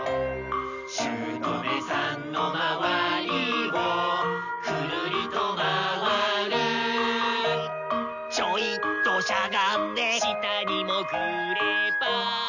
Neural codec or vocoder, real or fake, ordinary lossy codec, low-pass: none; real; none; 7.2 kHz